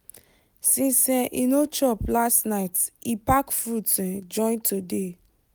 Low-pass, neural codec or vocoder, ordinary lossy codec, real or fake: none; none; none; real